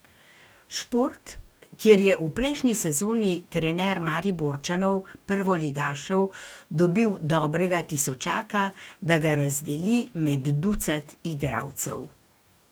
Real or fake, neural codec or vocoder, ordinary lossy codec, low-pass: fake; codec, 44.1 kHz, 2.6 kbps, DAC; none; none